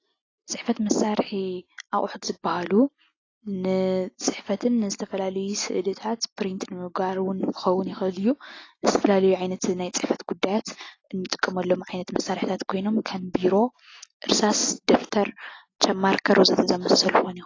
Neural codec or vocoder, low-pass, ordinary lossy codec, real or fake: none; 7.2 kHz; AAC, 32 kbps; real